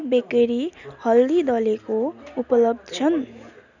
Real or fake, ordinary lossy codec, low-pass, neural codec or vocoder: real; none; 7.2 kHz; none